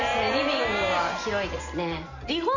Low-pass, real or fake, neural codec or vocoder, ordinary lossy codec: 7.2 kHz; real; none; none